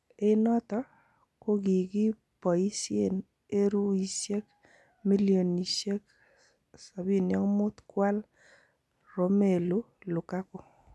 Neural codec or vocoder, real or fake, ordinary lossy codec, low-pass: none; real; none; none